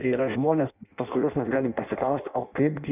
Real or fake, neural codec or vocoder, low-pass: fake; codec, 16 kHz in and 24 kHz out, 0.6 kbps, FireRedTTS-2 codec; 3.6 kHz